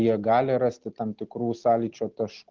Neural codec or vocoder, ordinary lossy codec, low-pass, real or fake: none; Opus, 16 kbps; 7.2 kHz; real